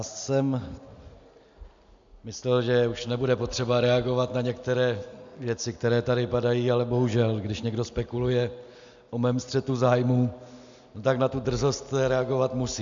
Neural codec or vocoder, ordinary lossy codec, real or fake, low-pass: none; MP3, 64 kbps; real; 7.2 kHz